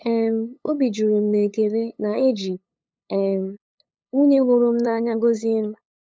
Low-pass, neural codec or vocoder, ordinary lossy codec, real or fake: none; codec, 16 kHz, 8 kbps, FunCodec, trained on LibriTTS, 25 frames a second; none; fake